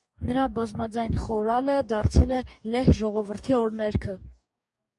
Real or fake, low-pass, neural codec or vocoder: fake; 10.8 kHz; codec, 44.1 kHz, 2.6 kbps, DAC